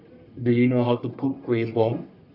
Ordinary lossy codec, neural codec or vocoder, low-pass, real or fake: none; codec, 44.1 kHz, 1.7 kbps, Pupu-Codec; 5.4 kHz; fake